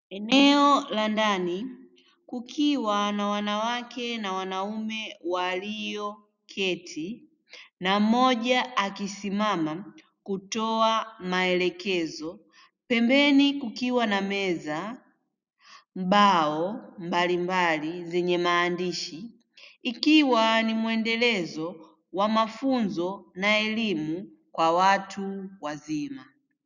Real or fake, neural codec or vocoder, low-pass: real; none; 7.2 kHz